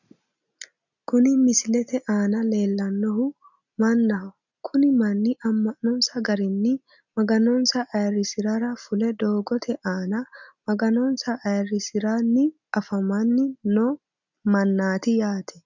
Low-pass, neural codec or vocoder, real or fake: 7.2 kHz; none; real